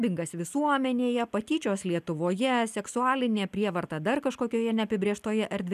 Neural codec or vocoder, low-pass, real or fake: none; 14.4 kHz; real